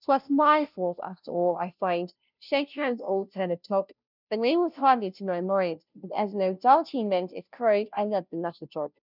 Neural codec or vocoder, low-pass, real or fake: codec, 16 kHz, 0.5 kbps, FunCodec, trained on Chinese and English, 25 frames a second; 5.4 kHz; fake